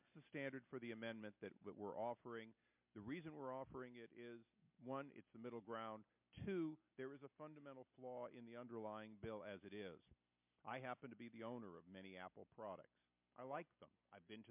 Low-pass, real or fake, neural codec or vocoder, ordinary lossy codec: 3.6 kHz; real; none; MP3, 32 kbps